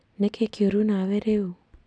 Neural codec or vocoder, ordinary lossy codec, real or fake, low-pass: none; none; real; 9.9 kHz